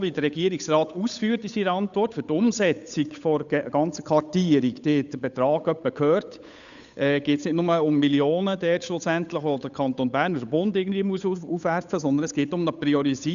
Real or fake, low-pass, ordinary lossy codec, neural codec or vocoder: fake; 7.2 kHz; none; codec, 16 kHz, 8 kbps, FunCodec, trained on Chinese and English, 25 frames a second